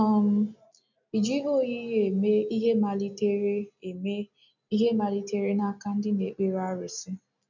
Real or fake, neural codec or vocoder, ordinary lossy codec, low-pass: real; none; none; 7.2 kHz